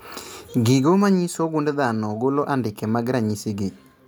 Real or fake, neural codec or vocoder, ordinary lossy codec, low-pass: real; none; none; none